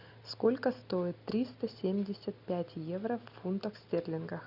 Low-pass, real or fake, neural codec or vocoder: 5.4 kHz; real; none